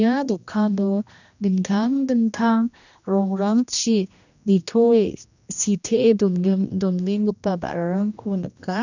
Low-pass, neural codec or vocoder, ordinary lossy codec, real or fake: 7.2 kHz; codec, 16 kHz, 1 kbps, X-Codec, HuBERT features, trained on general audio; none; fake